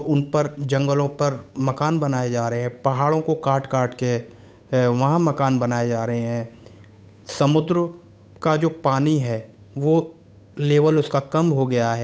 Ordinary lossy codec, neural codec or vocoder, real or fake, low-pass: none; codec, 16 kHz, 8 kbps, FunCodec, trained on Chinese and English, 25 frames a second; fake; none